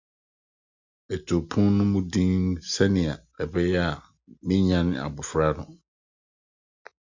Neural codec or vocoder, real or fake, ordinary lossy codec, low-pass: none; real; Opus, 64 kbps; 7.2 kHz